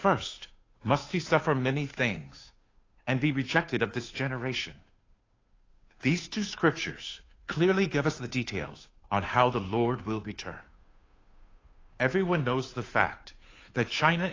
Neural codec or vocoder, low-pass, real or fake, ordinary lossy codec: codec, 16 kHz, 2 kbps, FunCodec, trained on Chinese and English, 25 frames a second; 7.2 kHz; fake; AAC, 32 kbps